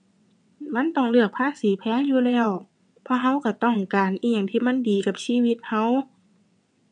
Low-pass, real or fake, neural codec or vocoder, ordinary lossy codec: 9.9 kHz; fake; vocoder, 22.05 kHz, 80 mel bands, WaveNeXt; MP3, 64 kbps